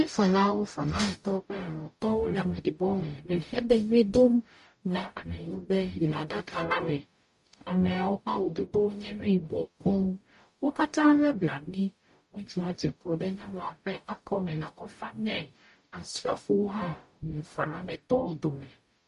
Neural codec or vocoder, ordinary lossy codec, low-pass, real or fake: codec, 44.1 kHz, 0.9 kbps, DAC; MP3, 48 kbps; 14.4 kHz; fake